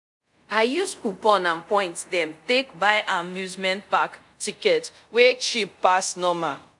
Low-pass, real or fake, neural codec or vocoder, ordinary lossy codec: 10.8 kHz; fake; codec, 24 kHz, 0.5 kbps, DualCodec; none